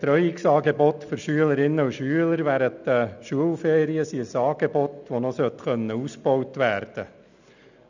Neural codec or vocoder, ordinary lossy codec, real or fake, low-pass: none; none; real; 7.2 kHz